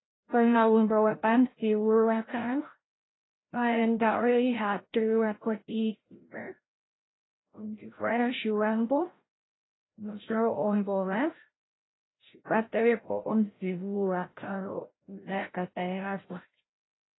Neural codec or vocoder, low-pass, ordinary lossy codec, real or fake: codec, 16 kHz, 0.5 kbps, FreqCodec, larger model; 7.2 kHz; AAC, 16 kbps; fake